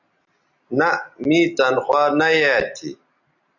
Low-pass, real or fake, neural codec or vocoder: 7.2 kHz; real; none